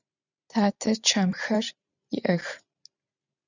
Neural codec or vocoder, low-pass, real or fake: vocoder, 44.1 kHz, 128 mel bands every 512 samples, BigVGAN v2; 7.2 kHz; fake